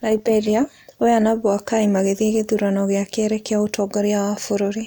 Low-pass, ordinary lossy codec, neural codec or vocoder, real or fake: none; none; none; real